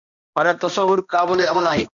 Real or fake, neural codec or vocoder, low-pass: fake; codec, 16 kHz, 1 kbps, X-Codec, HuBERT features, trained on balanced general audio; 7.2 kHz